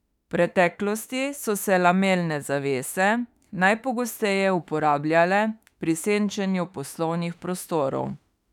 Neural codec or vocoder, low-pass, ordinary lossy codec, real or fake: autoencoder, 48 kHz, 32 numbers a frame, DAC-VAE, trained on Japanese speech; 19.8 kHz; none; fake